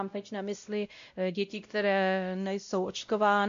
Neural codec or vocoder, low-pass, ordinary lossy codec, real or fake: codec, 16 kHz, 0.5 kbps, X-Codec, WavLM features, trained on Multilingual LibriSpeech; 7.2 kHz; AAC, 64 kbps; fake